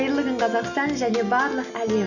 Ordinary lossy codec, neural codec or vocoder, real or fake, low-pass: none; none; real; 7.2 kHz